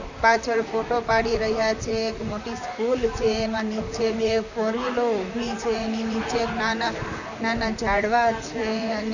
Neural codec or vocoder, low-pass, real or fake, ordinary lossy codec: vocoder, 44.1 kHz, 128 mel bands, Pupu-Vocoder; 7.2 kHz; fake; none